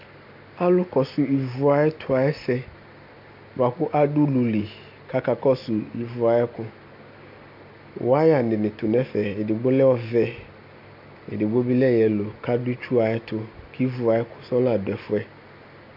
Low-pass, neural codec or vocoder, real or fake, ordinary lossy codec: 5.4 kHz; none; real; MP3, 48 kbps